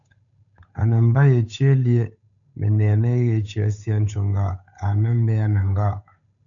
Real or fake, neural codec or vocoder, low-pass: fake; codec, 16 kHz, 8 kbps, FunCodec, trained on Chinese and English, 25 frames a second; 7.2 kHz